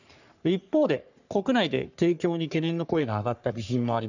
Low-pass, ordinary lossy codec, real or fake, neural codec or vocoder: 7.2 kHz; none; fake; codec, 44.1 kHz, 3.4 kbps, Pupu-Codec